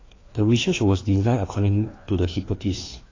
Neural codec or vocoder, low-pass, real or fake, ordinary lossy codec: codec, 16 kHz, 2 kbps, FreqCodec, larger model; 7.2 kHz; fake; AAC, 32 kbps